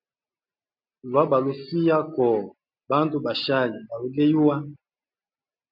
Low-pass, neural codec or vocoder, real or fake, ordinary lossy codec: 5.4 kHz; none; real; MP3, 48 kbps